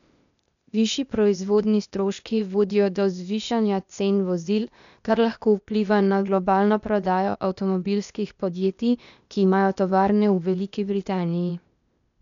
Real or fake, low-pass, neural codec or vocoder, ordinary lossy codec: fake; 7.2 kHz; codec, 16 kHz, 0.8 kbps, ZipCodec; none